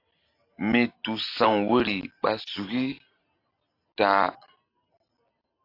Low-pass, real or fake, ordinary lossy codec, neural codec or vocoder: 5.4 kHz; real; AAC, 32 kbps; none